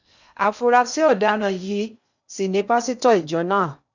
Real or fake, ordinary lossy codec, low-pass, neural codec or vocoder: fake; none; 7.2 kHz; codec, 16 kHz in and 24 kHz out, 0.6 kbps, FocalCodec, streaming, 2048 codes